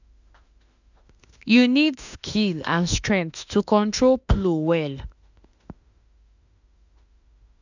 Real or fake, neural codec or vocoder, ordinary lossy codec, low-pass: fake; autoencoder, 48 kHz, 32 numbers a frame, DAC-VAE, trained on Japanese speech; none; 7.2 kHz